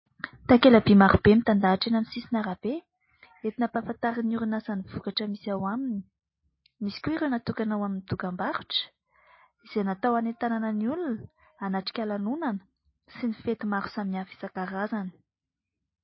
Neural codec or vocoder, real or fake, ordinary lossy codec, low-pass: none; real; MP3, 24 kbps; 7.2 kHz